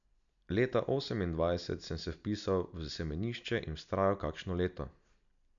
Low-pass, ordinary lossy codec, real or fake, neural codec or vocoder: 7.2 kHz; none; real; none